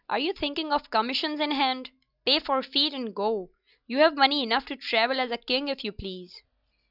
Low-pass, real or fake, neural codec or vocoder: 5.4 kHz; real; none